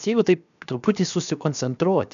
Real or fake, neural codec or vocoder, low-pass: fake; codec, 16 kHz, 0.7 kbps, FocalCodec; 7.2 kHz